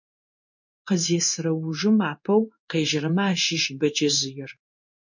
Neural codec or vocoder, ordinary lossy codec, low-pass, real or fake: codec, 16 kHz in and 24 kHz out, 1 kbps, XY-Tokenizer; MP3, 64 kbps; 7.2 kHz; fake